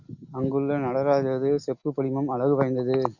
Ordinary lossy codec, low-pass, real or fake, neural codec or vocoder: Opus, 64 kbps; 7.2 kHz; real; none